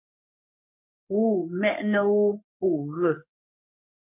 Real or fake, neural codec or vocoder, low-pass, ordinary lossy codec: fake; codec, 44.1 kHz, 3.4 kbps, Pupu-Codec; 3.6 kHz; MP3, 32 kbps